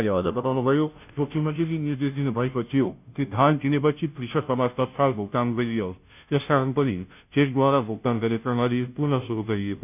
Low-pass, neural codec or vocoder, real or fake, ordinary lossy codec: 3.6 kHz; codec, 16 kHz, 0.5 kbps, FunCodec, trained on Chinese and English, 25 frames a second; fake; none